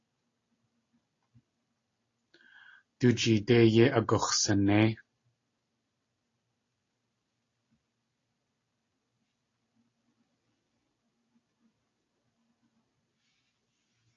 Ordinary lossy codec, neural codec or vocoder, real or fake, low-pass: AAC, 32 kbps; none; real; 7.2 kHz